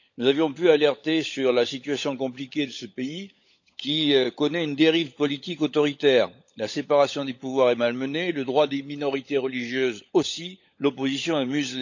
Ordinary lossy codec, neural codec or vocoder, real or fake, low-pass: none; codec, 16 kHz, 16 kbps, FunCodec, trained on LibriTTS, 50 frames a second; fake; 7.2 kHz